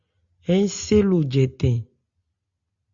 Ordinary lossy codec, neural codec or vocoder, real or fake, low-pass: Opus, 64 kbps; none; real; 7.2 kHz